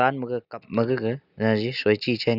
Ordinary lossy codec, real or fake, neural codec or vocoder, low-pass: none; real; none; 5.4 kHz